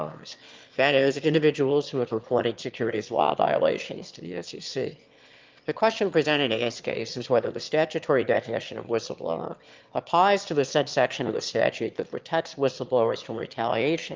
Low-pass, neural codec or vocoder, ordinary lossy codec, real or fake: 7.2 kHz; autoencoder, 22.05 kHz, a latent of 192 numbers a frame, VITS, trained on one speaker; Opus, 24 kbps; fake